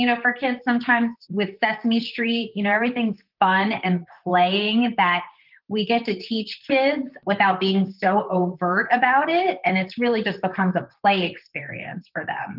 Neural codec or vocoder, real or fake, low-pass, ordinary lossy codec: vocoder, 44.1 kHz, 128 mel bands, Pupu-Vocoder; fake; 5.4 kHz; Opus, 32 kbps